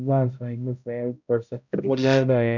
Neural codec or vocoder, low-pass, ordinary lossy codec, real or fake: codec, 16 kHz, 0.5 kbps, X-Codec, HuBERT features, trained on balanced general audio; 7.2 kHz; none; fake